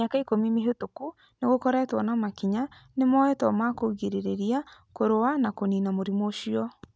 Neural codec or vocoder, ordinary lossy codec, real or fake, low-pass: none; none; real; none